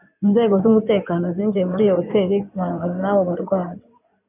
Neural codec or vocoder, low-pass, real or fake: codec, 16 kHz in and 24 kHz out, 2.2 kbps, FireRedTTS-2 codec; 3.6 kHz; fake